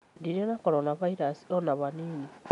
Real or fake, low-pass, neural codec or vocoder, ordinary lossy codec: real; 10.8 kHz; none; none